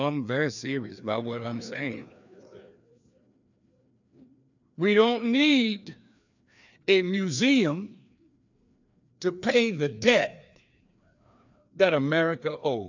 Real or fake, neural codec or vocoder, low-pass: fake; codec, 16 kHz, 2 kbps, FreqCodec, larger model; 7.2 kHz